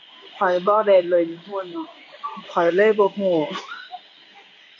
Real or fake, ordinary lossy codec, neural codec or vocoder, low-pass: fake; none; codec, 16 kHz in and 24 kHz out, 1 kbps, XY-Tokenizer; 7.2 kHz